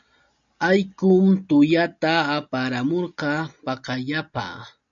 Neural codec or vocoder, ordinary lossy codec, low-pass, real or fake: none; MP3, 64 kbps; 7.2 kHz; real